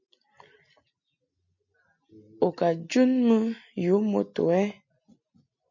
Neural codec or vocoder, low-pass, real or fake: none; 7.2 kHz; real